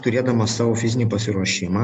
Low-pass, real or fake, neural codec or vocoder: 10.8 kHz; real; none